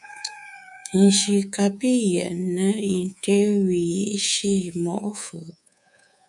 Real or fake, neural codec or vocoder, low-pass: fake; codec, 24 kHz, 3.1 kbps, DualCodec; 10.8 kHz